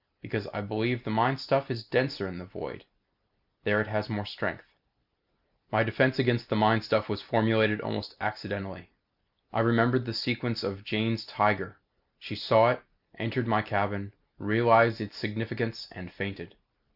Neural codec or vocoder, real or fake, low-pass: none; real; 5.4 kHz